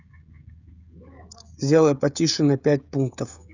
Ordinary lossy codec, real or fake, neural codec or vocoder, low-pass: none; fake; codec, 16 kHz, 16 kbps, FreqCodec, smaller model; 7.2 kHz